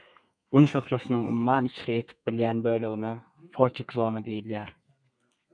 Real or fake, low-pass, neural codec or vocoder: fake; 9.9 kHz; codec, 32 kHz, 1.9 kbps, SNAC